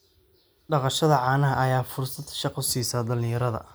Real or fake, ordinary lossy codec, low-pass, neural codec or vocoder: real; none; none; none